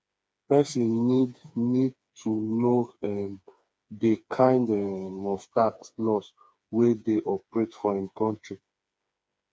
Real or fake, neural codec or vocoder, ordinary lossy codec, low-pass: fake; codec, 16 kHz, 4 kbps, FreqCodec, smaller model; none; none